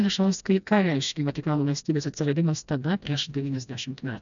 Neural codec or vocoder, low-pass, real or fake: codec, 16 kHz, 1 kbps, FreqCodec, smaller model; 7.2 kHz; fake